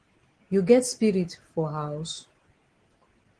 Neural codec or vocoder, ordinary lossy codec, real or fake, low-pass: none; Opus, 16 kbps; real; 9.9 kHz